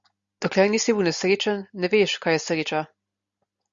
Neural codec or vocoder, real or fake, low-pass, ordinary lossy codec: none; real; 7.2 kHz; Opus, 64 kbps